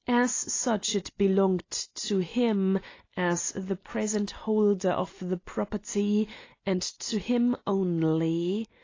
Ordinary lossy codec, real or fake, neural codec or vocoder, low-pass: AAC, 32 kbps; real; none; 7.2 kHz